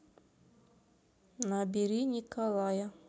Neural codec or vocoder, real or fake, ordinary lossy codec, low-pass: none; real; none; none